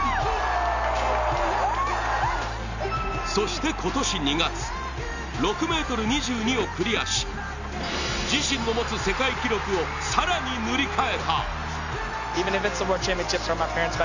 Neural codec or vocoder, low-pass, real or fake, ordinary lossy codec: none; 7.2 kHz; real; none